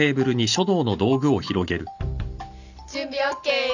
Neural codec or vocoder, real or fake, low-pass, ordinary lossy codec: none; real; 7.2 kHz; none